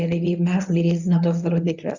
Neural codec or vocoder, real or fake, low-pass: codec, 24 kHz, 0.9 kbps, WavTokenizer, medium speech release version 1; fake; 7.2 kHz